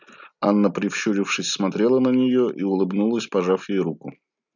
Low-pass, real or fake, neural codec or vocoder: 7.2 kHz; real; none